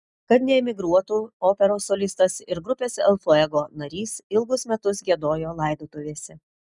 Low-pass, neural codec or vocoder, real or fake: 10.8 kHz; none; real